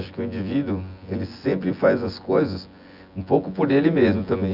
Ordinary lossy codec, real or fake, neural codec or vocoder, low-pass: Opus, 64 kbps; fake; vocoder, 24 kHz, 100 mel bands, Vocos; 5.4 kHz